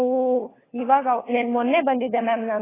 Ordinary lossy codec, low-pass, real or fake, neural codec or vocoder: AAC, 16 kbps; 3.6 kHz; fake; codec, 16 kHz, 4 kbps, FunCodec, trained on LibriTTS, 50 frames a second